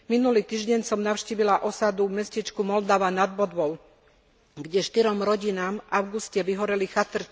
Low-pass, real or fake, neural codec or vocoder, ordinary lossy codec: none; real; none; none